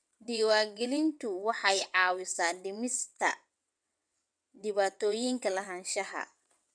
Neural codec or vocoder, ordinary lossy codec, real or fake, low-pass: vocoder, 22.05 kHz, 80 mel bands, WaveNeXt; none; fake; 9.9 kHz